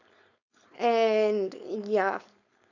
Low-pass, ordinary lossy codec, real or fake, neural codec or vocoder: 7.2 kHz; none; fake; codec, 16 kHz, 4.8 kbps, FACodec